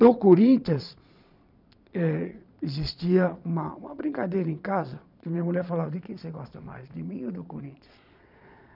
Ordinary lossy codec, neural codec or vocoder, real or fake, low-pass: none; vocoder, 22.05 kHz, 80 mel bands, Vocos; fake; 5.4 kHz